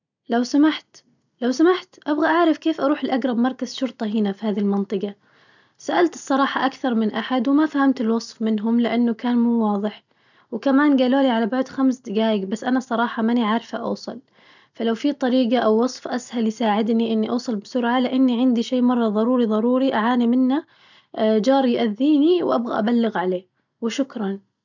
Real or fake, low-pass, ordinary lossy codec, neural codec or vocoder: real; 7.2 kHz; none; none